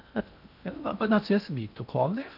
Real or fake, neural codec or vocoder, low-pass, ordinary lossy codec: fake; codec, 16 kHz in and 24 kHz out, 0.6 kbps, FocalCodec, streaming, 2048 codes; 5.4 kHz; none